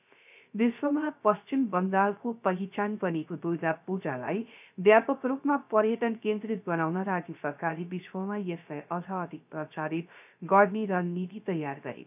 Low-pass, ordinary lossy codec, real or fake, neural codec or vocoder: 3.6 kHz; none; fake; codec, 16 kHz, 0.3 kbps, FocalCodec